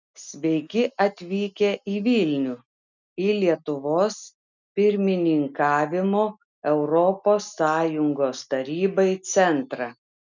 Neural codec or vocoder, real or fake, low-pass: none; real; 7.2 kHz